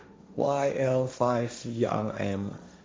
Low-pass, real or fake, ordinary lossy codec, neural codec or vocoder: none; fake; none; codec, 16 kHz, 1.1 kbps, Voila-Tokenizer